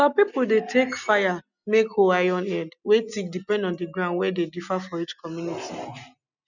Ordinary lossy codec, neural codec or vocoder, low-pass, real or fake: none; none; 7.2 kHz; real